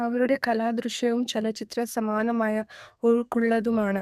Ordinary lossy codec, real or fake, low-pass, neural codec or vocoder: none; fake; 14.4 kHz; codec, 32 kHz, 1.9 kbps, SNAC